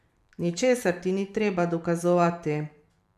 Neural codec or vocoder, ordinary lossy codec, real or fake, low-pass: vocoder, 44.1 kHz, 128 mel bands every 512 samples, BigVGAN v2; none; fake; 14.4 kHz